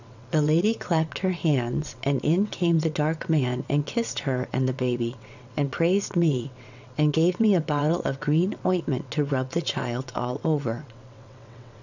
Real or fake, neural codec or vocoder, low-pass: fake; vocoder, 22.05 kHz, 80 mel bands, WaveNeXt; 7.2 kHz